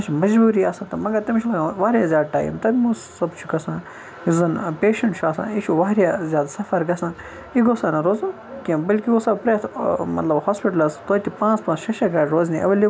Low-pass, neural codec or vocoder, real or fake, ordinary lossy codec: none; none; real; none